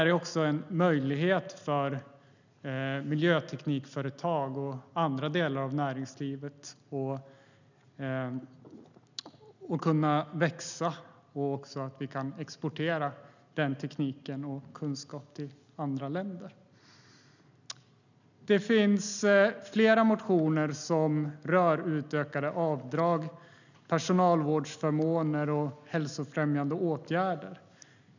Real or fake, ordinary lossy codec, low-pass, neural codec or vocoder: real; none; 7.2 kHz; none